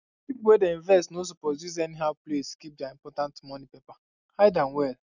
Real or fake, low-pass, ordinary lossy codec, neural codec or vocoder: real; 7.2 kHz; none; none